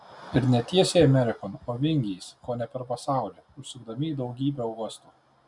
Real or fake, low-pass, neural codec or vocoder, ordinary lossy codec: real; 10.8 kHz; none; MP3, 96 kbps